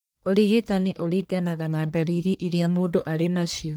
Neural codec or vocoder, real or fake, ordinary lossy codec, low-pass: codec, 44.1 kHz, 1.7 kbps, Pupu-Codec; fake; none; none